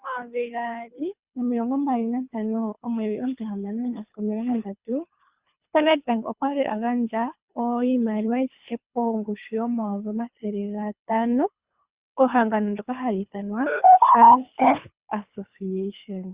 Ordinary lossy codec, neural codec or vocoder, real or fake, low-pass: Opus, 64 kbps; codec, 24 kHz, 3 kbps, HILCodec; fake; 3.6 kHz